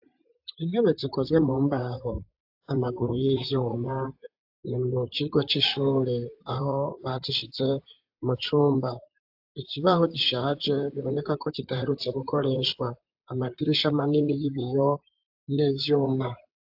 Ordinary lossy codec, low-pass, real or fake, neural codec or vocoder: AAC, 48 kbps; 5.4 kHz; fake; vocoder, 44.1 kHz, 128 mel bands, Pupu-Vocoder